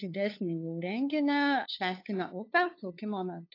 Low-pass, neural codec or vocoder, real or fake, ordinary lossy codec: 5.4 kHz; codec, 16 kHz, 2 kbps, FunCodec, trained on LibriTTS, 25 frames a second; fake; AAC, 32 kbps